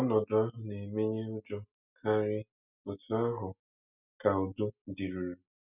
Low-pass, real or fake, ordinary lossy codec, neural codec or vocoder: 3.6 kHz; real; none; none